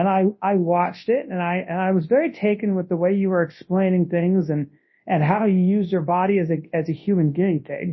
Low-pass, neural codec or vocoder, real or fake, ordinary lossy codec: 7.2 kHz; codec, 24 kHz, 0.9 kbps, WavTokenizer, large speech release; fake; MP3, 24 kbps